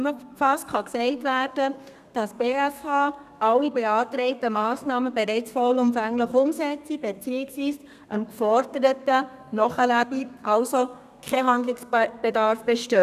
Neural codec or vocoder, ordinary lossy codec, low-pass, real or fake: codec, 32 kHz, 1.9 kbps, SNAC; none; 14.4 kHz; fake